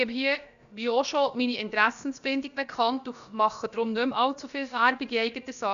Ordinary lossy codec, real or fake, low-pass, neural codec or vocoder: none; fake; 7.2 kHz; codec, 16 kHz, about 1 kbps, DyCAST, with the encoder's durations